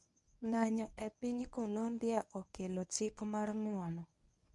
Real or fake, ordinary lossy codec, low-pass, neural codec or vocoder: fake; none; 10.8 kHz; codec, 24 kHz, 0.9 kbps, WavTokenizer, medium speech release version 1